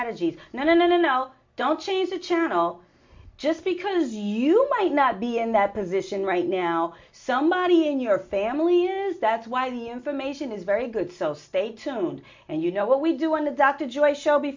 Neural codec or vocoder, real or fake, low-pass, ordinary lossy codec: none; real; 7.2 kHz; MP3, 48 kbps